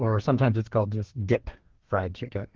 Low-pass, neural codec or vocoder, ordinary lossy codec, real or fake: 7.2 kHz; codec, 32 kHz, 1.9 kbps, SNAC; Opus, 16 kbps; fake